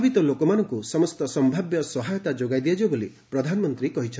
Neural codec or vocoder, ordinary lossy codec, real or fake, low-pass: none; none; real; none